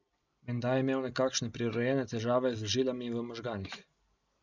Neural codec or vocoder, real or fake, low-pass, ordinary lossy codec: none; real; 7.2 kHz; none